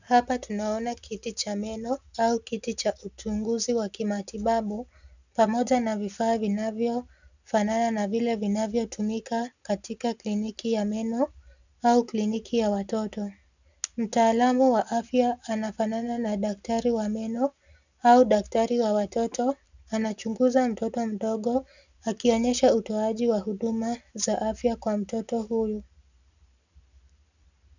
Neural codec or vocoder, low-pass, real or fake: none; 7.2 kHz; real